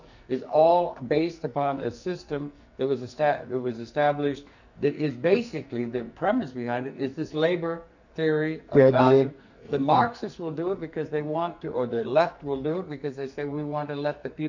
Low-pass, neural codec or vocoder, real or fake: 7.2 kHz; codec, 44.1 kHz, 2.6 kbps, SNAC; fake